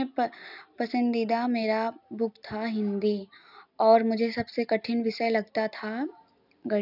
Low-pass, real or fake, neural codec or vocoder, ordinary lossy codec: 5.4 kHz; real; none; none